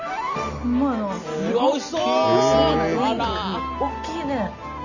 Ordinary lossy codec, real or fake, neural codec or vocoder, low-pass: none; real; none; 7.2 kHz